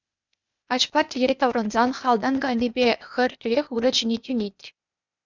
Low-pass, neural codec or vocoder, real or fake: 7.2 kHz; codec, 16 kHz, 0.8 kbps, ZipCodec; fake